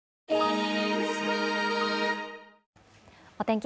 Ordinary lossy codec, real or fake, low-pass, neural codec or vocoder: none; real; none; none